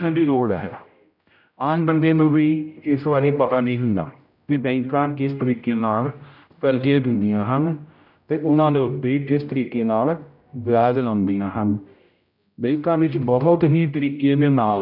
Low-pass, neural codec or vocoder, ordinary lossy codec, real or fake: 5.4 kHz; codec, 16 kHz, 0.5 kbps, X-Codec, HuBERT features, trained on general audio; none; fake